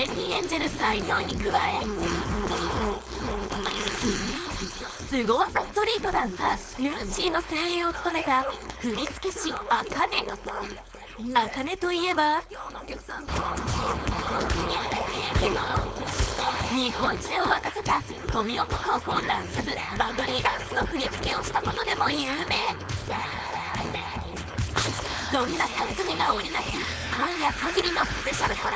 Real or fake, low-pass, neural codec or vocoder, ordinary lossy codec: fake; none; codec, 16 kHz, 4.8 kbps, FACodec; none